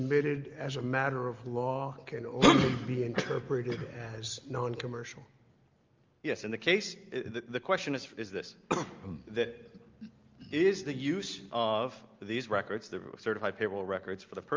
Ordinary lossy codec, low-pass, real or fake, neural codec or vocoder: Opus, 24 kbps; 7.2 kHz; real; none